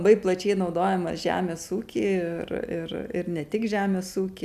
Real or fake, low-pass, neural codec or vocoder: real; 14.4 kHz; none